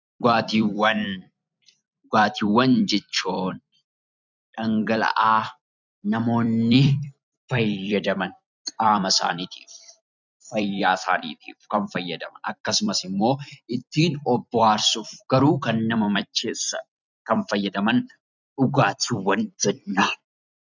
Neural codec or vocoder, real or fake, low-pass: vocoder, 44.1 kHz, 128 mel bands every 256 samples, BigVGAN v2; fake; 7.2 kHz